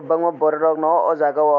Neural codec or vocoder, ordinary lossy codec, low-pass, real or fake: none; none; 7.2 kHz; real